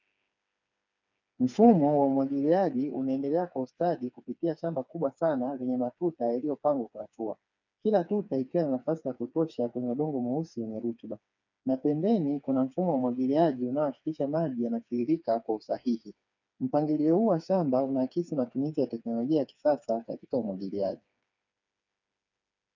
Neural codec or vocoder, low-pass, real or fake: codec, 16 kHz, 4 kbps, FreqCodec, smaller model; 7.2 kHz; fake